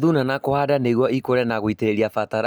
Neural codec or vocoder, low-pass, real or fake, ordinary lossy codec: none; none; real; none